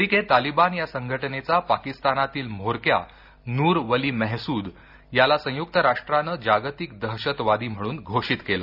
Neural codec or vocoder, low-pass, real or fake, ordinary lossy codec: none; 5.4 kHz; real; none